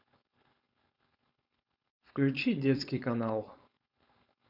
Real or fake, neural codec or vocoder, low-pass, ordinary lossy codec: fake; codec, 16 kHz, 4.8 kbps, FACodec; 5.4 kHz; none